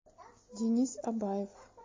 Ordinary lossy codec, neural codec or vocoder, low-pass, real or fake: MP3, 32 kbps; none; 7.2 kHz; real